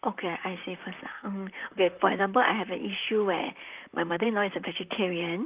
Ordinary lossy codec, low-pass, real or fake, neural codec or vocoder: Opus, 24 kbps; 3.6 kHz; fake; vocoder, 44.1 kHz, 128 mel bands, Pupu-Vocoder